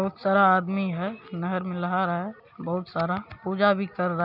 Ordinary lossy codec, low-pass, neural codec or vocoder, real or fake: none; 5.4 kHz; none; real